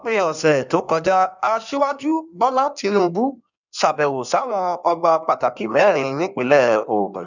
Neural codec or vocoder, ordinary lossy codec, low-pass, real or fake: codec, 16 kHz in and 24 kHz out, 1.1 kbps, FireRedTTS-2 codec; none; 7.2 kHz; fake